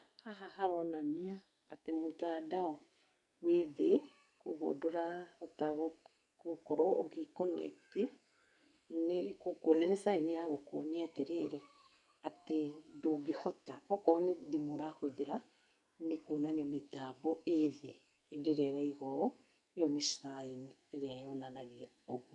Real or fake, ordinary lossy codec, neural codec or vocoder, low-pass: fake; none; codec, 44.1 kHz, 2.6 kbps, SNAC; 10.8 kHz